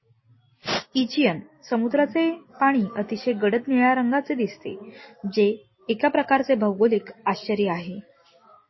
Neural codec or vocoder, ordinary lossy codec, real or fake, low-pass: none; MP3, 24 kbps; real; 7.2 kHz